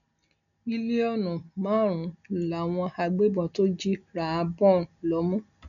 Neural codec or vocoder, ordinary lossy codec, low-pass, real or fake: none; none; 7.2 kHz; real